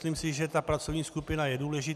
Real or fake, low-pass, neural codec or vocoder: real; 14.4 kHz; none